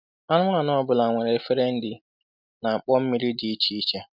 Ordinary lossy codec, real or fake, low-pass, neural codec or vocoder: none; real; 5.4 kHz; none